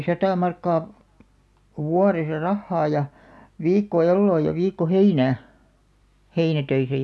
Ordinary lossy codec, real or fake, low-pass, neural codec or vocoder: none; real; none; none